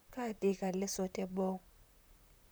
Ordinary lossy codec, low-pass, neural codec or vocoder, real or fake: none; none; vocoder, 44.1 kHz, 128 mel bands, Pupu-Vocoder; fake